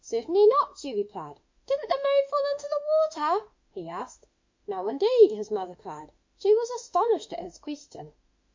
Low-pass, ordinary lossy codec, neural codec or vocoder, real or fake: 7.2 kHz; MP3, 48 kbps; autoencoder, 48 kHz, 32 numbers a frame, DAC-VAE, trained on Japanese speech; fake